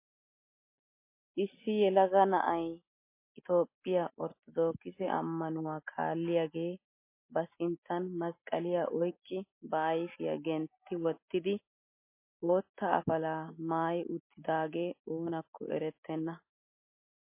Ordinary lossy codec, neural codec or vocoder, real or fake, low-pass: MP3, 24 kbps; none; real; 3.6 kHz